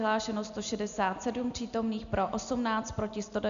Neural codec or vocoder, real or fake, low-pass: none; real; 7.2 kHz